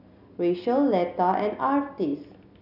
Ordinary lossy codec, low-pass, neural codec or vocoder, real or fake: none; 5.4 kHz; none; real